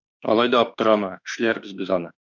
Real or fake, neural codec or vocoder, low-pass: fake; autoencoder, 48 kHz, 32 numbers a frame, DAC-VAE, trained on Japanese speech; 7.2 kHz